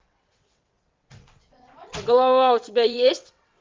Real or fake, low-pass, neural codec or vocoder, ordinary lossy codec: fake; 7.2 kHz; vocoder, 22.05 kHz, 80 mel bands, WaveNeXt; Opus, 32 kbps